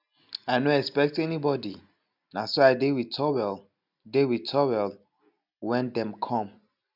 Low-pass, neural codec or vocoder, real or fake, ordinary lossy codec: 5.4 kHz; none; real; none